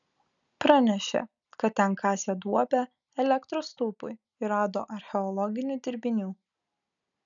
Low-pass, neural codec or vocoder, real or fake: 7.2 kHz; none; real